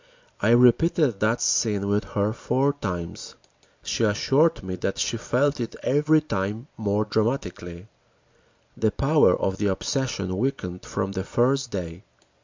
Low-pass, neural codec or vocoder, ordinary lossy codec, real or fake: 7.2 kHz; none; MP3, 64 kbps; real